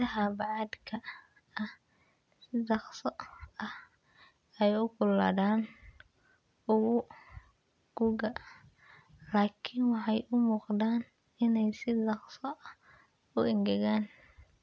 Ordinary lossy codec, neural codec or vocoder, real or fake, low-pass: none; none; real; none